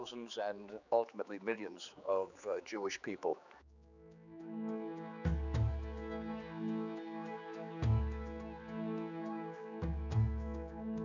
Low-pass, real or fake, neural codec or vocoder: 7.2 kHz; fake; codec, 16 kHz, 4 kbps, X-Codec, HuBERT features, trained on general audio